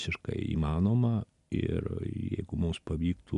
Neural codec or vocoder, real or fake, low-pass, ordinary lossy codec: none; real; 10.8 kHz; AAC, 96 kbps